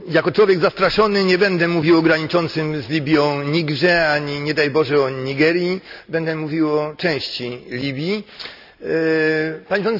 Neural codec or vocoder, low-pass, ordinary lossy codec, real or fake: none; 5.4 kHz; none; real